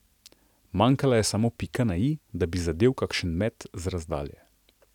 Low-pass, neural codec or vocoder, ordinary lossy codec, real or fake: 19.8 kHz; none; none; real